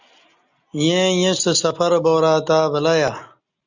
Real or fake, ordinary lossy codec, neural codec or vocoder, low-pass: real; Opus, 64 kbps; none; 7.2 kHz